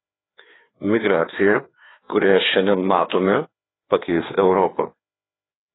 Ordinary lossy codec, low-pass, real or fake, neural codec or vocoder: AAC, 16 kbps; 7.2 kHz; fake; codec, 16 kHz, 2 kbps, FreqCodec, larger model